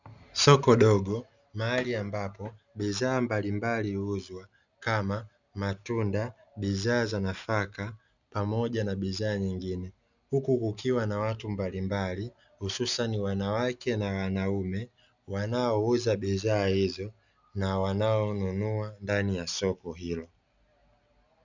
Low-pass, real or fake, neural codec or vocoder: 7.2 kHz; real; none